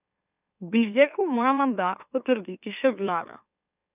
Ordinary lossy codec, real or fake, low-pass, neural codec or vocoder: none; fake; 3.6 kHz; autoencoder, 44.1 kHz, a latent of 192 numbers a frame, MeloTTS